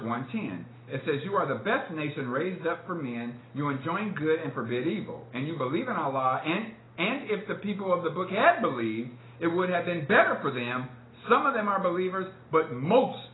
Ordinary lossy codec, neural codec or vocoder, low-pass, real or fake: AAC, 16 kbps; none; 7.2 kHz; real